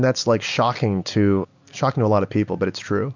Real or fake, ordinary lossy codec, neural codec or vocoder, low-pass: real; MP3, 64 kbps; none; 7.2 kHz